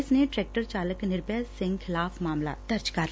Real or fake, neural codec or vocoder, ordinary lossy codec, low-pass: real; none; none; none